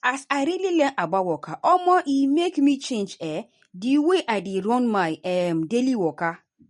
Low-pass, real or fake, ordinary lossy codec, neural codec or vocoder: 19.8 kHz; real; MP3, 48 kbps; none